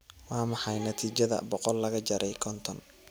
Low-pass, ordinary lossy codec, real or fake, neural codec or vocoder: none; none; real; none